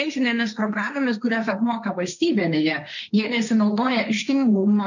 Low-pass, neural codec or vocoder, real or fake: 7.2 kHz; codec, 16 kHz, 1.1 kbps, Voila-Tokenizer; fake